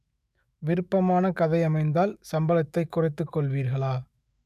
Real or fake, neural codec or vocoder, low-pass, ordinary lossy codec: fake; autoencoder, 48 kHz, 128 numbers a frame, DAC-VAE, trained on Japanese speech; 14.4 kHz; none